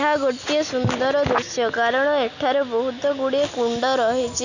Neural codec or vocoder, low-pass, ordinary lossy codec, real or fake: none; 7.2 kHz; none; real